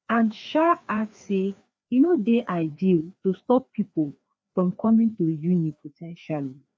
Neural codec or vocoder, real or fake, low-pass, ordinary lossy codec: codec, 16 kHz, 2 kbps, FreqCodec, larger model; fake; none; none